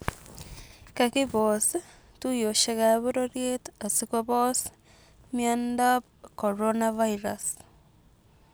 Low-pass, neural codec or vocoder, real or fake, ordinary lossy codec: none; none; real; none